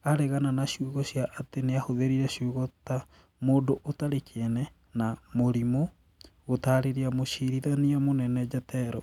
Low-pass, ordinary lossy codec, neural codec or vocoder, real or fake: 19.8 kHz; none; none; real